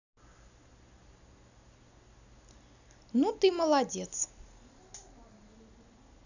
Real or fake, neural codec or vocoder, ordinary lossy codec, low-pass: real; none; none; 7.2 kHz